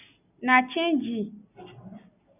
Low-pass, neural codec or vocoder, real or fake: 3.6 kHz; none; real